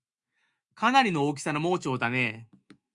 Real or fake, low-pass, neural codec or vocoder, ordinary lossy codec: fake; 10.8 kHz; autoencoder, 48 kHz, 128 numbers a frame, DAC-VAE, trained on Japanese speech; Opus, 64 kbps